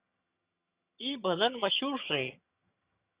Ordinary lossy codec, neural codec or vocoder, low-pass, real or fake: Opus, 64 kbps; vocoder, 22.05 kHz, 80 mel bands, HiFi-GAN; 3.6 kHz; fake